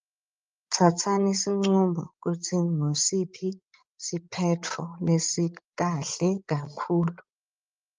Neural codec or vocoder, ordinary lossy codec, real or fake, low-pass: codec, 16 kHz, 16 kbps, FreqCodec, larger model; Opus, 24 kbps; fake; 7.2 kHz